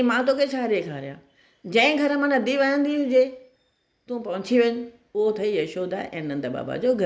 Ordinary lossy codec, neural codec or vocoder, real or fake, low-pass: none; none; real; none